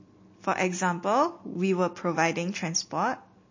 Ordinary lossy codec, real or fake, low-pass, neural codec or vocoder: MP3, 32 kbps; real; 7.2 kHz; none